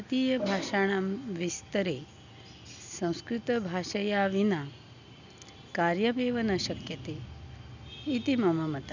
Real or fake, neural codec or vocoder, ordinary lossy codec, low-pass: real; none; none; 7.2 kHz